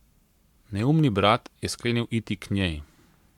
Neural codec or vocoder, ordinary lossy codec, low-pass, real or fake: codec, 44.1 kHz, 7.8 kbps, Pupu-Codec; MP3, 96 kbps; 19.8 kHz; fake